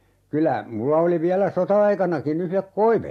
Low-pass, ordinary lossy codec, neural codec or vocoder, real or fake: 14.4 kHz; AAC, 64 kbps; none; real